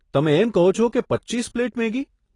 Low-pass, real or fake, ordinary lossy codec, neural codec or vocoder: 10.8 kHz; real; AAC, 32 kbps; none